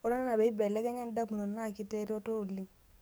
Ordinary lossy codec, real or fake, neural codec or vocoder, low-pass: none; fake; codec, 44.1 kHz, 7.8 kbps, DAC; none